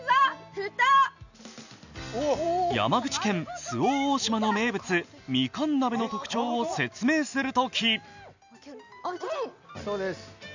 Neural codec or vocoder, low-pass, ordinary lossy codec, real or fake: none; 7.2 kHz; none; real